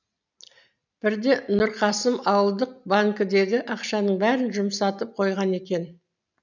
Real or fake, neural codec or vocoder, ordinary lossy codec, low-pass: real; none; none; 7.2 kHz